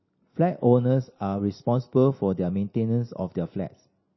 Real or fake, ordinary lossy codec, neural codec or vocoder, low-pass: real; MP3, 24 kbps; none; 7.2 kHz